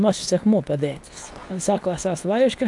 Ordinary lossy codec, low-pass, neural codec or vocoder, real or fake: AAC, 64 kbps; 10.8 kHz; codec, 24 kHz, 0.9 kbps, WavTokenizer, medium speech release version 2; fake